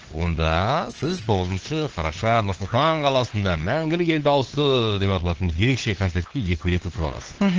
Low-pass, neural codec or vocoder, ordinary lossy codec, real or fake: 7.2 kHz; codec, 16 kHz, 2 kbps, FunCodec, trained on LibriTTS, 25 frames a second; Opus, 16 kbps; fake